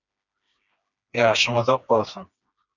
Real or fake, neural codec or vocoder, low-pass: fake; codec, 16 kHz, 2 kbps, FreqCodec, smaller model; 7.2 kHz